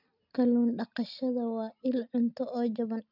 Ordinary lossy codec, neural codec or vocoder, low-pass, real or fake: none; none; 5.4 kHz; real